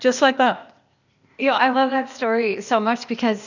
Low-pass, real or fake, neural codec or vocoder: 7.2 kHz; fake; codec, 16 kHz, 0.8 kbps, ZipCodec